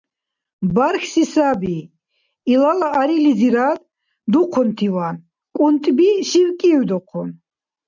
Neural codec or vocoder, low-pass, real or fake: none; 7.2 kHz; real